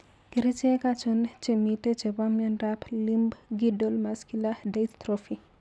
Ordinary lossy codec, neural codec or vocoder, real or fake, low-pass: none; none; real; none